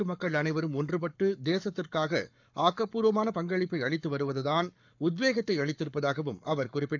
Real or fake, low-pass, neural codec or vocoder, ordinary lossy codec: fake; 7.2 kHz; codec, 44.1 kHz, 7.8 kbps, Pupu-Codec; none